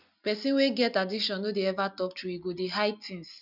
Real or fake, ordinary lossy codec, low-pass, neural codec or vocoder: real; none; 5.4 kHz; none